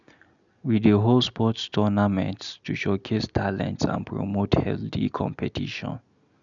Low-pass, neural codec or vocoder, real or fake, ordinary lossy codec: 7.2 kHz; none; real; none